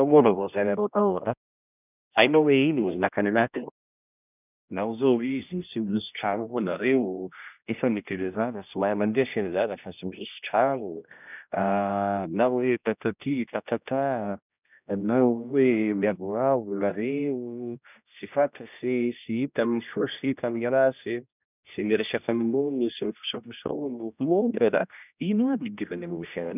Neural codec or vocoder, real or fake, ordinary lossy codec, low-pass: codec, 16 kHz, 1 kbps, X-Codec, HuBERT features, trained on general audio; fake; none; 3.6 kHz